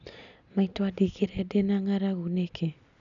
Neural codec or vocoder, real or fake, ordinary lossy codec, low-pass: none; real; none; 7.2 kHz